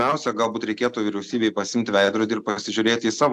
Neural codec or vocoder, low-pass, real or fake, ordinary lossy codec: none; 14.4 kHz; real; AAC, 96 kbps